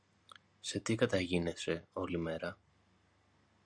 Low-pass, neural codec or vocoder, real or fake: 9.9 kHz; none; real